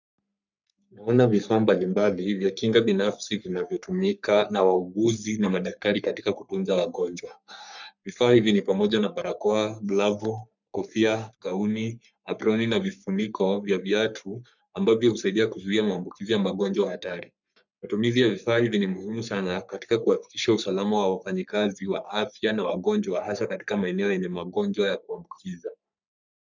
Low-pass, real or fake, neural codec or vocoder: 7.2 kHz; fake; codec, 44.1 kHz, 3.4 kbps, Pupu-Codec